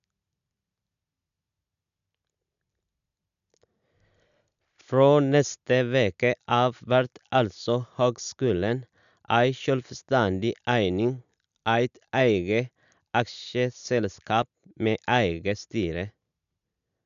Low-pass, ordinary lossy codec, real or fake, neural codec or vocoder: 7.2 kHz; none; real; none